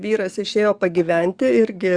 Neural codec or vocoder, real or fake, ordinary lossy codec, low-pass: codec, 44.1 kHz, 7.8 kbps, DAC; fake; Opus, 64 kbps; 9.9 kHz